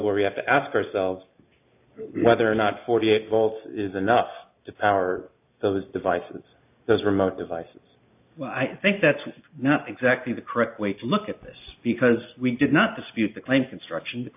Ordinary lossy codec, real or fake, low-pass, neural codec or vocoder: AAC, 32 kbps; real; 3.6 kHz; none